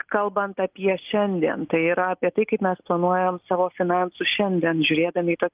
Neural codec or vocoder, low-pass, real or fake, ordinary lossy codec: none; 3.6 kHz; real; Opus, 32 kbps